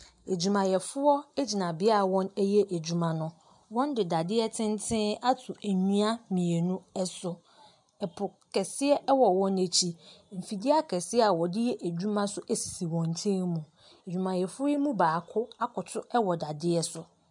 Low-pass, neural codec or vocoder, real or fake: 10.8 kHz; none; real